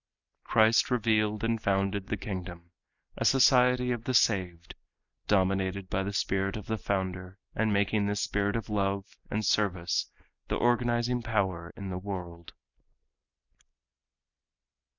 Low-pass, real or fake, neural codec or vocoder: 7.2 kHz; real; none